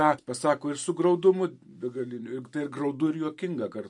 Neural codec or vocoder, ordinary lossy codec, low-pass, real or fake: none; MP3, 48 kbps; 10.8 kHz; real